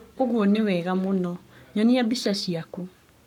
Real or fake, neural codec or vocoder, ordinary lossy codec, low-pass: fake; codec, 44.1 kHz, 7.8 kbps, Pupu-Codec; none; 19.8 kHz